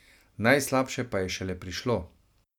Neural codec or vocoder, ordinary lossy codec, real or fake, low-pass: none; none; real; 19.8 kHz